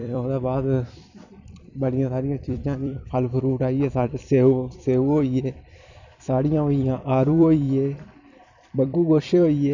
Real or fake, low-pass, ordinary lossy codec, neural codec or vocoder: fake; 7.2 kHz; none; vocoder, 44.1 kHz, 128 mel bands every 256 samples, BigVGAN v2